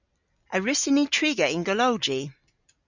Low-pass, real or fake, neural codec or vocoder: 7.2 kHz; real; none